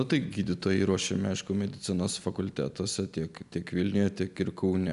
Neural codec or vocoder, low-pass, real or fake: none; 10.8 kHz; real